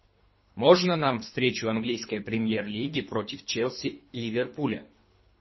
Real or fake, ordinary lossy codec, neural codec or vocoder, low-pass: fake; MP3, 24 kbps; codec, 24 kHz, 3 kbps, HILCodec; 7.2 kHz